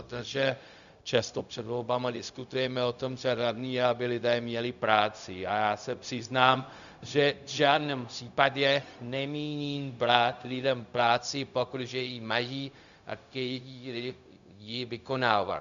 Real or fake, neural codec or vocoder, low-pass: fake; codec, 16 kHz, 0.4 kbps, LongCat-Audio-Codec; 7.2 kHz